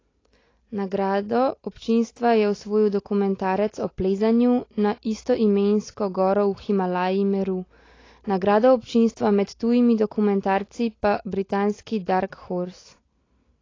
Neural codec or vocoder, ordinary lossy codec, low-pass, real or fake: none; AAC, 32 kbps; 7.2 kHz; real